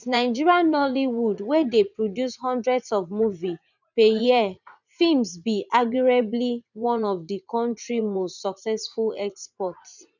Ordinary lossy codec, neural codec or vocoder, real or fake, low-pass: none; vocoder, 44.1 kHz, 80 mel bands, Vocos; fake; 7.2 kHz